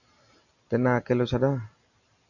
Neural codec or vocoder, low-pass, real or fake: none; 7.2 kHz; real